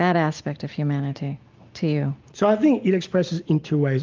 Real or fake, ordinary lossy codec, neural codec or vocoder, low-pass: real; Opus, 24 kbps; none; 7.2 kHz